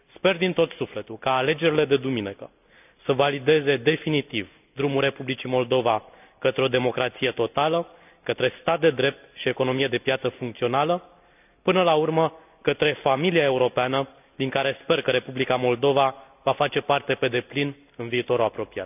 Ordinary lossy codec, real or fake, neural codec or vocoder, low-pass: none; real; none; 3.6 kHz